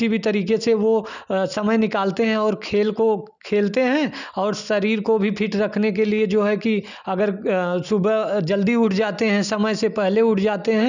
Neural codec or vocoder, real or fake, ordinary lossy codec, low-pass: none; real; none; 7.2 kHz